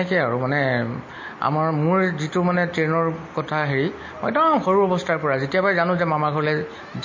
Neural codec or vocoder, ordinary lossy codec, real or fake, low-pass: none; MP3, 32 kbps; real; 7.2 kHz